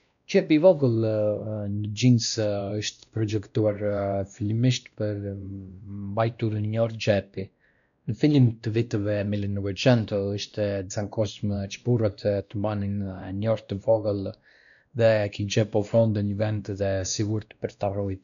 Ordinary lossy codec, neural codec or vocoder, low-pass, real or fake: none; codec, 16 kHz, 1 kbps, X-Codec, WavLM features, trained on Multilingual LibriSpeech; 7.2 kHz; fake